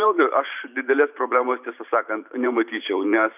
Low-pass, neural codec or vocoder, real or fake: 3.6 kHz; vocoder, 44.1 kHz, 128 mel bands every 512 samples, BigVGAN v2; fake